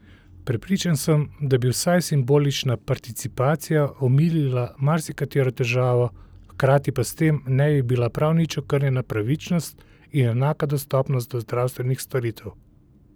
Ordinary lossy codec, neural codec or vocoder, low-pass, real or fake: none; none; none; real